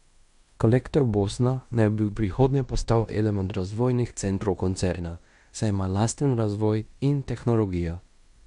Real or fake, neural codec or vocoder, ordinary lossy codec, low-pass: fake; codec, 16 kHz in and 24 kHz out, 0.9 kbps, LongCat-Audio-Codec, fine tuned four codebook decoder; none; 10.8 kHz